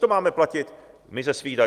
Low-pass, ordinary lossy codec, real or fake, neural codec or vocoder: 14.4 kHz; Opus, 32 kbps; real; none